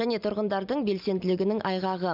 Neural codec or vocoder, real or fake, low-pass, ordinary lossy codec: none; real; 5.4 kHz; none